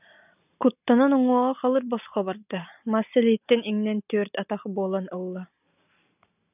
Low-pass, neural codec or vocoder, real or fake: 3.6 kHz; none; real